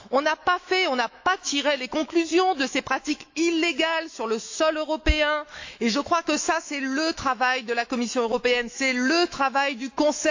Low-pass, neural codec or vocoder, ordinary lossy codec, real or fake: 7.2 kHz; autoencoder, 48 kHz, 128 numbers a frame, DAC-VAE, trained on Japanese speech; AAC, 48 kbps; fake